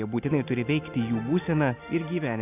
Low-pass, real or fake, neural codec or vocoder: 3.6 kHz; real; none